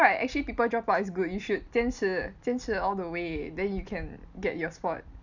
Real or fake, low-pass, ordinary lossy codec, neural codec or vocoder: real; 7.2 kHz; none; none